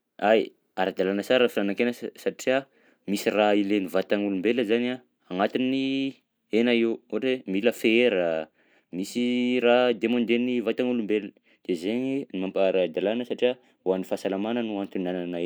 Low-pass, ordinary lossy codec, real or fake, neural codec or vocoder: none; none; real; none